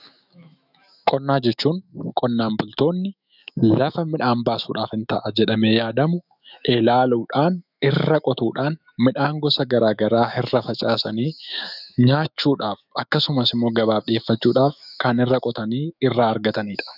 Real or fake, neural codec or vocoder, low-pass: fake; autoencoder, 48 kHz, 128 numbers a frame, DAC-VAE, trained on Japanese speech; 5.4 kHz